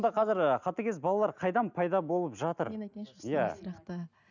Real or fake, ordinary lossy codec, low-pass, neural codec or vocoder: real; none; 7.2 kHz; none